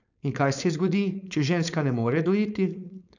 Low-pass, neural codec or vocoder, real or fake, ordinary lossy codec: 7.2 kHz; codec, 16 kHz, 4.8 kbps, FACodec; fake; none